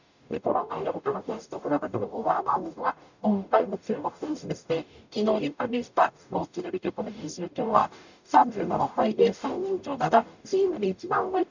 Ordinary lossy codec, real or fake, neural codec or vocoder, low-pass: none; fake; codec, 44.1 kHz, 0.9 kbps, DAC; 7.2 kHz